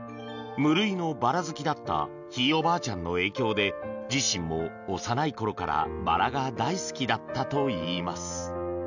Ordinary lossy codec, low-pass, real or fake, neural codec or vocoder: none; 7.2 kHz; real; none